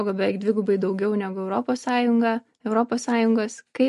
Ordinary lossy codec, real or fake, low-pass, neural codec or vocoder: MP3, 48 kbps; real; 14.4 kHz; none